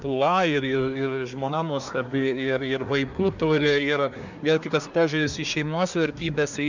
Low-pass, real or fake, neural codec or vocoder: 7.2 kHz; fake; codec, 24 kHz, 1 kbps, SNAC